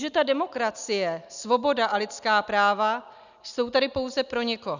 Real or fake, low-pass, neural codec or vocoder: real; 7.2 kHz; none